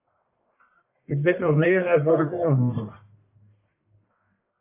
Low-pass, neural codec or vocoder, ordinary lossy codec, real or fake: 3.6 kHz; codec, 44.1 kHz, 1.7 kbps, Pupu-Codec; AAC, 24 kbps; fake